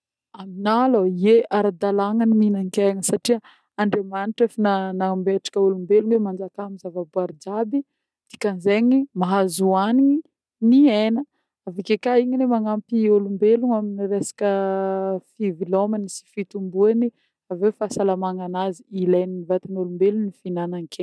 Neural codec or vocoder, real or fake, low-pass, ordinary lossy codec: none; real; none; none